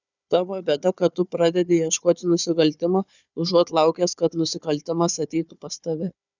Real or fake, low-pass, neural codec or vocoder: fake; 7.2 kHz; codec, 16 kHz, 4 kbps, FunCodec, trained on Chinese and English, 50 frames a second